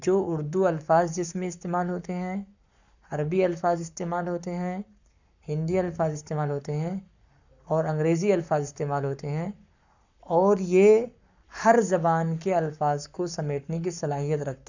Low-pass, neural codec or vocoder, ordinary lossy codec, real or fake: 7.2 kHz; codec, 44.1 kHz, 7.8 kbps, Pupu-Codec; none; fake